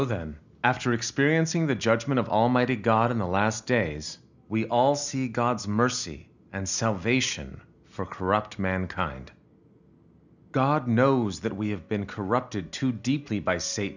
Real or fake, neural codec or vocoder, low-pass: real; none; 7.2 kHz